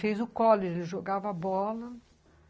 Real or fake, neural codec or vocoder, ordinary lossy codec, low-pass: real; none; none; none